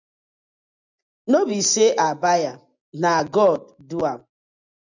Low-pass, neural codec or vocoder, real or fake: 7.2 kHz; none; real